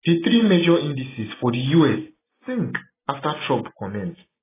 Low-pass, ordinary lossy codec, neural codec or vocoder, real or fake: 3.6 kHz; AAC, 16 kbps; none; real